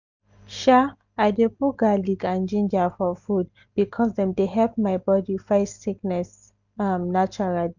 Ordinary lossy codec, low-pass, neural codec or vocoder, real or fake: none; 7.2 kHz; none; real